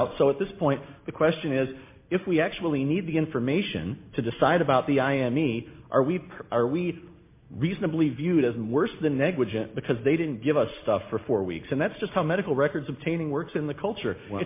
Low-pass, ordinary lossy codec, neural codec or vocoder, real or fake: 3.6 kHz; MP3, 24 kbps; none; real